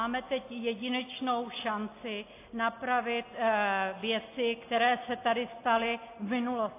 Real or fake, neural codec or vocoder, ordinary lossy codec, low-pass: real; none; AAC, 24 kbps; 3.6 kHz